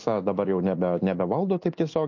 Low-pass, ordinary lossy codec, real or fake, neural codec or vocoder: 7.2 kHz; MP3, 48 kbps; real; none